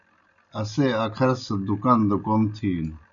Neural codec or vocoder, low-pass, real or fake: none; 7.2 kHz; real